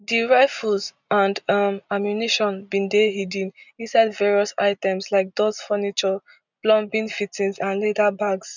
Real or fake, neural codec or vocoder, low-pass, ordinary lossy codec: real; none; 7.2 kHz; none